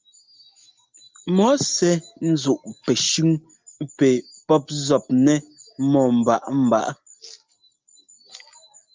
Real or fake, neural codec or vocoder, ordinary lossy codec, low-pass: real; none; Opus, 24 kbps; 7.2 kHz